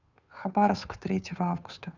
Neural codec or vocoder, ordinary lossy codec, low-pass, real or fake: codec, 16 kHz, 2 kbps, FunCodec, trained on Chinese and English, 25 frames a second; none; 7.2 kHz; fake